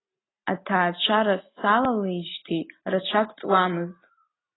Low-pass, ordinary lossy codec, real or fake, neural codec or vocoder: 7.2 kHz; AAC, 16 kbps; real; none